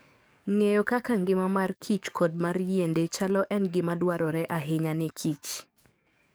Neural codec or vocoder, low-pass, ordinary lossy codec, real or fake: codec, 44.1 kHz, 7.8 kbps, DAC; none; none; fake